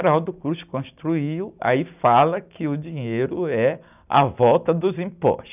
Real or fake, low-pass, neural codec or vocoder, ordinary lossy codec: real; 3.6 kHz; none; none